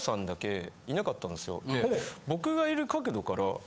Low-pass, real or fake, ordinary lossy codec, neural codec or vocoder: none; fake; none; codec, 16 kHz, 8 kbps, FunCodec, trained on Chinese and English, 25 frames a second